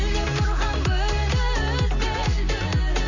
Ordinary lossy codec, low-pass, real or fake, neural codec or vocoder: none; 7.2 kHz; real; none